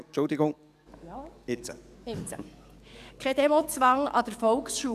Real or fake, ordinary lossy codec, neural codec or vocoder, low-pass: fake; none; codec, 44.1 kHz, 7.8 kbps, DAC; 14.4 kHz